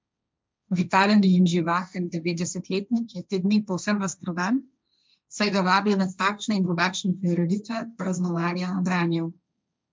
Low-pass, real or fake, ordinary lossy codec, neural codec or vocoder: none; fake; none; codec, 16 kHz, 1.1 kbps, Voila-Tokenizer